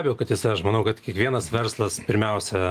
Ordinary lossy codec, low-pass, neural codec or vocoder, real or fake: Opus, 32 kbps; 14.4 kHz; none; real